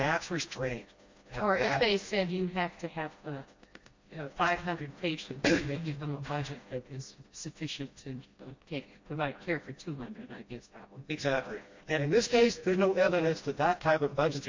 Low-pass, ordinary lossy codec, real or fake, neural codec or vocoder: 7.2 kHz; MP3, 48 kbps; fake; codec, 16 kHz, 1 kbps, FreqCodec, smaller model